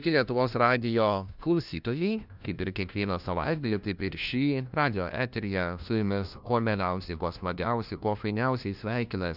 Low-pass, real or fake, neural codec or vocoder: 5.4 kHz; fake; codec, 16 kHz, 1 kbps, FunCodec, trained on LibriTTS, 50 frames a second